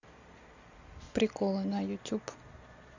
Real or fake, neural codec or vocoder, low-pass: real; none; 7.2 kHz